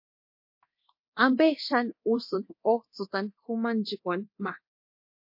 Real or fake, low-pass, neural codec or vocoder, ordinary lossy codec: fake; 5.4 kHz; codec, 24 kHz, 0.9 kbps, DualCodec; MP3, 32 kbps